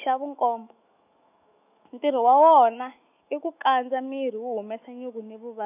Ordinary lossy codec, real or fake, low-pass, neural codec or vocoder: none; fake; 3.6 kHz; autoencoder, 48 kHz, 128 numbers a frame, DAC-VAE, trained on Japanese speech